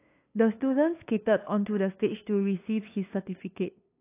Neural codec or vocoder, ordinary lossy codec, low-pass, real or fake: codec, 16 kHz, 2 kbps, FunCodec, trained on LibriTTS, 25 frames a second; AAC, 24 kbps; 3.6 kHz; fake